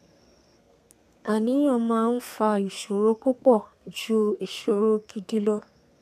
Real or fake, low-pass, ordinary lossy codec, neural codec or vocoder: fake; 14.4 kHz; none; codec, 32 kHz, 1.9 kbps, SNAC